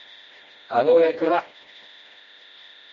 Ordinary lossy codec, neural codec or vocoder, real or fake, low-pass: MP3, 48 kbps; codec, 16 kHz, 1 kbps, FreqCodec, smaller model; fake; 7.2 kHz